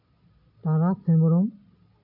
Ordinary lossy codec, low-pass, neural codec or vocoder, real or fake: none; 5.4 kHz; none; real